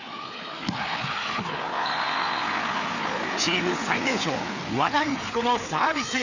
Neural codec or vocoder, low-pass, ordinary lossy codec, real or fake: codec, 16 kHz, 4 kbps, FreqCodec, larger model; 7.2 kHz; none; fake